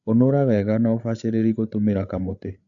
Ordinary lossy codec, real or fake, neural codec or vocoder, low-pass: AAC, 64 kbps; fake; codec, 16 kHz, 8 kbps, FreqCodec, larger model; 7.2 kHz